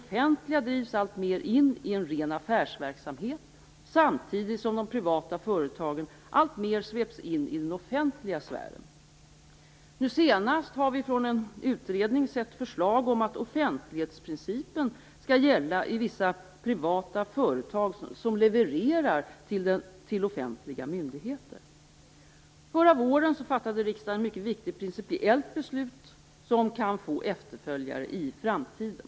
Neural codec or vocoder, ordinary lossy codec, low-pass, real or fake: none; none; none; real